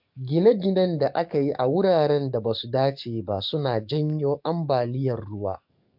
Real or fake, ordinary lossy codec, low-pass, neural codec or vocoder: fake; MP3, 48 kbps; 5.4 kHz; codec, 44.1 kHz, 7.8 kbps, DAC